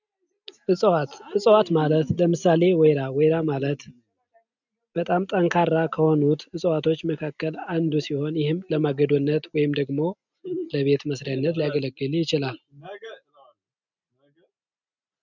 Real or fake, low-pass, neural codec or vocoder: real; 7.2 kHz; none